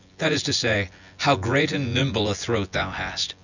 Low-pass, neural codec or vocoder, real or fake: 7.2 kHz; vocoder, 24 kHz, 100 mel bands, Vocos; fake